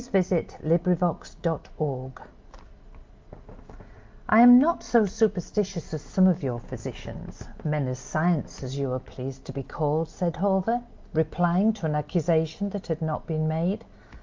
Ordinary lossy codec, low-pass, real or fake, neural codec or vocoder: Opus, 24 kbps; 7.2 kHz; real; none